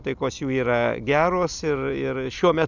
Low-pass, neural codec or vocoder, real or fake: 7.2 kHz; none; real